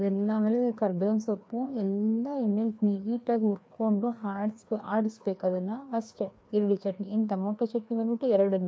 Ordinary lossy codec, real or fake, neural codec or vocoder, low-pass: none; fake; codec, 16 kHz, 2 kbps, FreqCodec, larger model; none